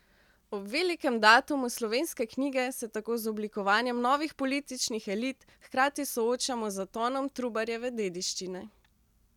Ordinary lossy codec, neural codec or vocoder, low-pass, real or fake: none; none; 19.8 kHz; real